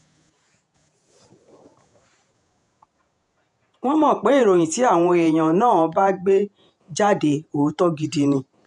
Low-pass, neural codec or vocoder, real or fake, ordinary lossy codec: 10.8 kHz; vocoder, 48 kHz, 128 mel bands, Vocos; fake; none